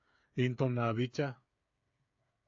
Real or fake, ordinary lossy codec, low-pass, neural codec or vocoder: fake; AAC, 64 kbps; 7.2 kHz; codec, 16 kHz, 8 kbps, FreqCodec, smaller model